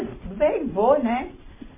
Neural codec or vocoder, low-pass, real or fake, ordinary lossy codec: none; 3.6 kHz; real; MP3, 16 kbps